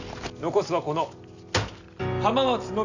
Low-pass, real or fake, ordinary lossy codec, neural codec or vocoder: 7.2 kHz; real; none; none